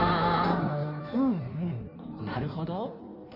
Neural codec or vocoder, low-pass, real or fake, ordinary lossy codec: codec, 16 kHz in and 24 kHz out, 1.1 kbps, FireRedTTS-2 codec; 5.4 kHz; fake; none